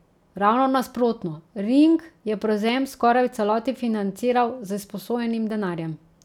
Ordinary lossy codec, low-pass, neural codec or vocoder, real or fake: none; 19.8 kHz; none; real